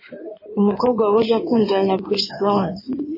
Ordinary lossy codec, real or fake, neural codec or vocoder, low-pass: MP3, 24 kbps; fake; codec, 16 kHz in and 24 kHz out, 2.2 kbps, FireRedTTS-2 codec; 5.4 kHz